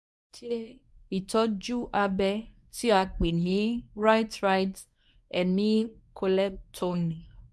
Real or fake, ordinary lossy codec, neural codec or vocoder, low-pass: fake; none; codec, 24 kHz, 0.9 kbps, WavTokenizer, medium speech release version 1; none